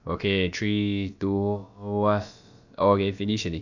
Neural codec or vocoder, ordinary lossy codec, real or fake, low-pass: codec, 16 kHz, about 1 kbps, DyCAST, with the encoder's durations; none; fake; 7.2 kHz